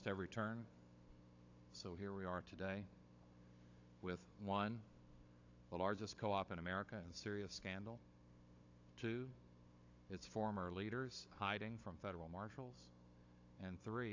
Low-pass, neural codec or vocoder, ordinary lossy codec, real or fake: 7.2 kHz; codec, 16 kHz, 16 kbps, FunCodec, trained on Chinese and English, 50 frames a second; AAC, 48 kbps; fake